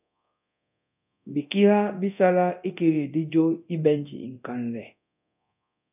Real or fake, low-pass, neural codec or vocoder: fake; 3.6 kHz; codec, 24 kHz, 0.9 kbps, DualCodec